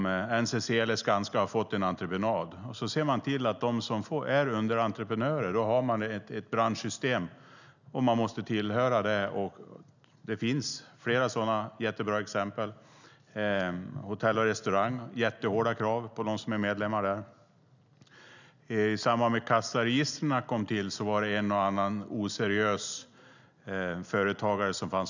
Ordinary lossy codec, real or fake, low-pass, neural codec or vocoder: none; real; 7.2 kHz; none